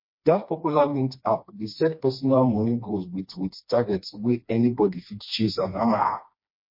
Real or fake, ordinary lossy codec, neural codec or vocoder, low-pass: fake; MP3, 32 kbps; codec, 16 kHz, 2 kbps, FreqCodec, smaller model; 5.4 kHz